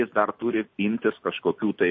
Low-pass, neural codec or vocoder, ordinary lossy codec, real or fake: 7.2 kHz; none; MP3, 32 kbps; real